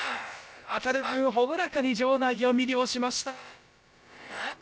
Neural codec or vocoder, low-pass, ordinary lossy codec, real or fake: codec, 16 kHz, about 1 kbps, DyCAST, with the encoder's durations; none; none; fake